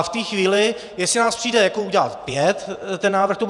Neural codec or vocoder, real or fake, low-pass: vocoder, 48 kHz, 128 mel bands, Vocos; fake; 10.8 kHz